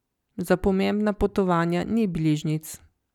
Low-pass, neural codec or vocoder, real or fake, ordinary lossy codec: 19.8 kHz; none; real; none